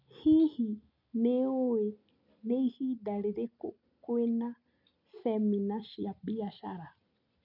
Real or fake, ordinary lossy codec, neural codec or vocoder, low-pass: real; none; none; 5.4 kHz